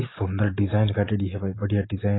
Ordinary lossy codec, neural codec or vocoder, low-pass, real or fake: AAC, 16 kbps; none; 7.2 kHz; real